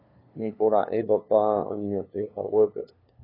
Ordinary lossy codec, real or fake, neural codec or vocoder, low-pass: AAC, 24 kbps; fake; codec, 16 kHz, 2 kbps, FunCodec, trained on LibriTTS, 25 frames a second; 5.4 kHz